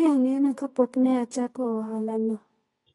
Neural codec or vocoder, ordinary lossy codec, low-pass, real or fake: codec, 24 kHz, 0.9 kbps, WavTokenizer, medium music audio release; AAC, 32 kbps; 10.8 kHz; fake